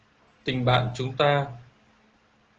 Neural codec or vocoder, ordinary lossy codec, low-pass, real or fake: none; Opus, 16 kbps; 7.2 kHz; real